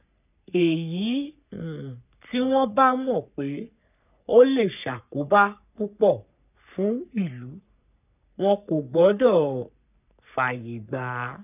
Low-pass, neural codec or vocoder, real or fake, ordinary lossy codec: 3.6 kHz; codec, 44.1 kHz, 3.4 kbps, Pupu-Codec; fake; none